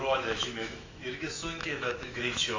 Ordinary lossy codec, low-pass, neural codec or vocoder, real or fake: AAC, 48 kbps; 7.2 kHz; autoencoder, 48 kHz, 128 numbers a frame, DAC-VAE, trained on Japanese speech; fake